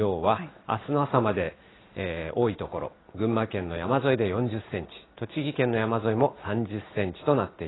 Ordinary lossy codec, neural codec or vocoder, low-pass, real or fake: AAC, 16 kbps; none; 7.2 kHz; real